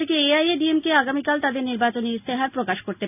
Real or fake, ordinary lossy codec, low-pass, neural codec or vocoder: real; none; 3.6 kHz; none